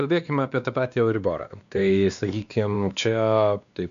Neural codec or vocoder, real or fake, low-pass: codec, 16 kHz, 2 kbps, X-Codec, WavLM features, trained on Multilingual LibriSpeech; fake; 7.2 kHz